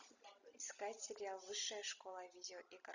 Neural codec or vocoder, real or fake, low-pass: none; real; 7.2 kHz